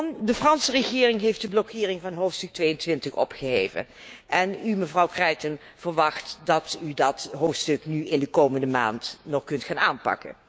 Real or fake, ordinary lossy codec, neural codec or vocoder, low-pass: fake; none; codec, 16 kHz, 6 kbps, DAC; none